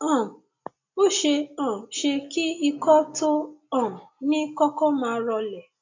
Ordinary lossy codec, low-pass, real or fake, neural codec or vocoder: AAC, 48 kbps; 7.2 kHz; real; none